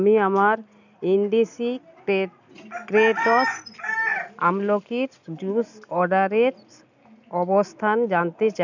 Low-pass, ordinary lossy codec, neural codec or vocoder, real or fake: 7.2 kHz; none; none; real